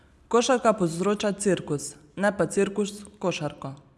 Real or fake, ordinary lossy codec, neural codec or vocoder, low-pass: real; none; none; none